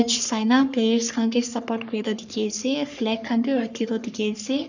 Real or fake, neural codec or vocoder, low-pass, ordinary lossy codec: fake; codec, 44.1 kHz, 3.4 kbps, Pupu-Codec; 7.2 kHz; none